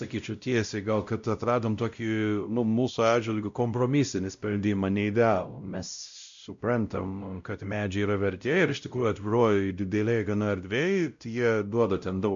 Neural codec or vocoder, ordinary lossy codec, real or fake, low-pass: codec, 16 kHz, 0.5 kbps, X-Codec, WavLM features, trained on Multilingual LibriSpeech; MP3, 64 kbps; fake; 7.2 kHz